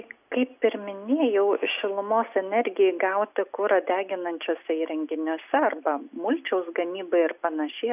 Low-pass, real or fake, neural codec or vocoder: 3.6 kHz; real; none